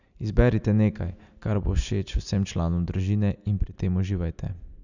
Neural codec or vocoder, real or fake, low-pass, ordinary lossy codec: none; real; 7.2 kHz; none